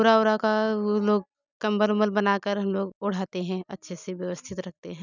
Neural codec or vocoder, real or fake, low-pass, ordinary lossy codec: none; real; 7.2 kHz; none